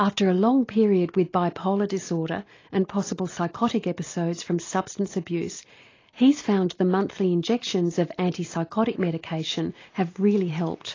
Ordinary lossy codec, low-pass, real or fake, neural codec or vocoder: AAC, 32 kbps; 7.2 kHz; real; none